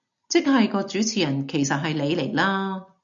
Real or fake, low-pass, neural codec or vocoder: real; 7.2 kHz; none